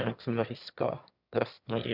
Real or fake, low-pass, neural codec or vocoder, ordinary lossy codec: fake; 5.4 kHz; autoencoder, 22.05 kHz, a latent of 192 numbers a frame, VITS, trained on one speaker; none